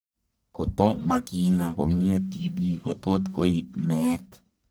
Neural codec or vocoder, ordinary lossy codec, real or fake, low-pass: codec, 44.1 kHz, 1.7 kbps, Pupu-Codec; none; fake; none